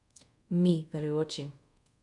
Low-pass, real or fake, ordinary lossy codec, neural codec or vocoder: 10.8 kHz; fake; none; codec, 24 kHz, 0.5 kbps, DualCodec